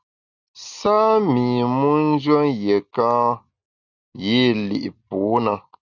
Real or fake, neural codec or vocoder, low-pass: real; none; 7.2 kHz